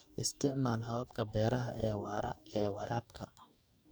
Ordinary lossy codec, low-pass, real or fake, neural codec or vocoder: none; none; fake; codec, 44.1 kHz, 2.6 kbps, DAC